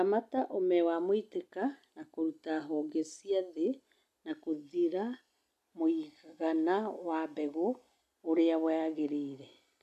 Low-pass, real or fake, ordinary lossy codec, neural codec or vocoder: 14.4 kHz; real; none; none